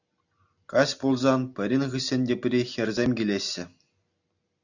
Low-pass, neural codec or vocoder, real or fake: 7.2 kHz; none; real